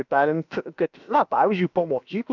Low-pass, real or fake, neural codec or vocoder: 7.2 kHz; fake; codec, 16 kHz, about 1 kbps, DyCAST, with the encoder's durations